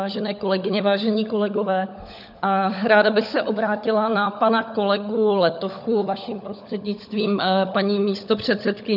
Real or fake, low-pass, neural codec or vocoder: fake; 5.4 kHz; codec, 16 kHz, 16 kbps, FunCodec, trained on LibriTTS, 50 frames a second